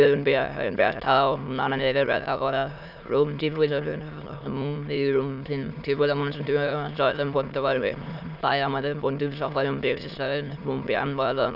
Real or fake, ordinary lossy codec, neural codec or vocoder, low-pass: fake; none; autoencoder, 22.05 kHz, a latent of 192 numbers a frame, VITS, trained on many speakers; 5.4 kHz